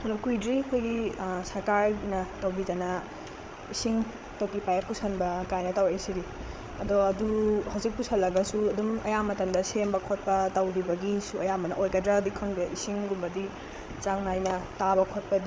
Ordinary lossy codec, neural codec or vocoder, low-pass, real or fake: none; codec, 16 kHz, 8 kbps, FreqCodec, larger model; none; fake